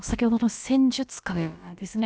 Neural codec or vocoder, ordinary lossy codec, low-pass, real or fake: codec, 16 kHz, about 1 kbps, DyCAST, with the encoder's durations; none; none; fake